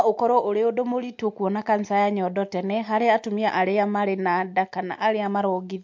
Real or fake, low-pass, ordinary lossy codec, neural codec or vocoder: real; 7.2 kHz; MP3, 48 kbps; none